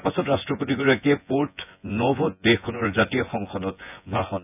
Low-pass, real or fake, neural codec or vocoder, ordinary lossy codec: 3.6 kHz; fake; vocoder, 24 kHz, 100 mel bands, Vocos; none